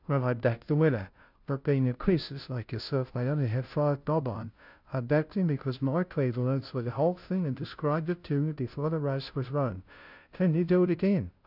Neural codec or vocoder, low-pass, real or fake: codec, 16 kHz, 0.5 kbps, FunCodec, trained on Chinese and English, 25 frames a second; 5.4 kHz; fake